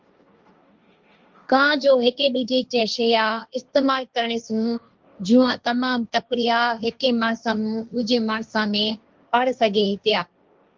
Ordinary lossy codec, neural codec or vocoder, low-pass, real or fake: Opus, 24 kbps; codec, 16 kHz, 1.1 kbps, Voila-Tokenizer; 7.2 kHz; fake